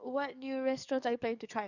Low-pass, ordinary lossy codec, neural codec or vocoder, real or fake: 7.2 kHz; none; codec, 44.1 kHz, 7.8 kbps, DAC; fake